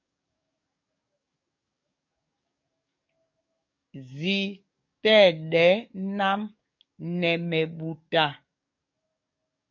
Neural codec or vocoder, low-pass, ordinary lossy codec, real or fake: codec, 44.1 kHz, 7.8 kbps, DAC; 7.2 kHz; MP3, 48 kbps; fake